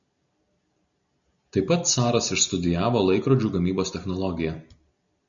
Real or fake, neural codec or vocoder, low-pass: real; none; 7.2 kHz